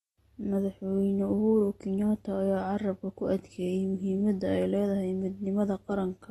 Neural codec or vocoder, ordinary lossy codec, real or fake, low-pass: none; AAC, 32 kbps; real; 19.8 kHz